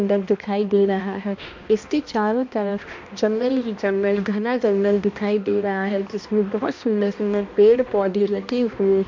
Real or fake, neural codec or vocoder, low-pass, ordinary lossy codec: fake; codec, 16 kHz, 1 kbps, X-Codec, HuBERT features, trained on balanced general audio; 7.2 kHz; MP3, 48 kbps